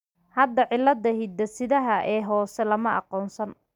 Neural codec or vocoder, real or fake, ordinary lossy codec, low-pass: none; real; none; 19.8 kHz